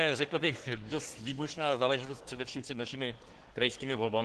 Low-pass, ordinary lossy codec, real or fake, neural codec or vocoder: 10.8 kHz; Opus, 16 kbps; fake; codec, 24 kHz, 1 kbps, SNAC